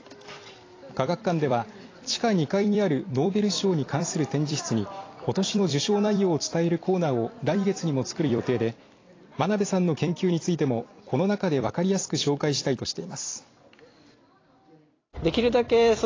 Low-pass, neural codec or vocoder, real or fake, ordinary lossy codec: 7.2 kHz; vocoder, 44.1 kHz, 128 mel bands every 256 samples, BigVGAN v2; fake; AAC, 32 kbps